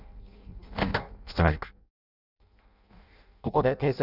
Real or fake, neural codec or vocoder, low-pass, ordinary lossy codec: fake; codec, 16 kHz in and 24 kHz out, 0.6 kbps, FireRedTTS-2 codec; 5.4 kHz; none